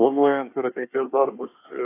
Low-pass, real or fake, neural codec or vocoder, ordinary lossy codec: 3.6 kHz; fake; codec, 24 kHz, 1 kbps, SNAC; AAC, 24 kbps